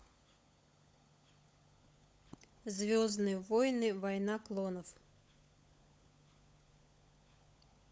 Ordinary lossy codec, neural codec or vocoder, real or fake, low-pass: none; codec, 16 kHz, 16 kbps, FunCodec, trained on LibriTTS, 50 frames a second; fake; none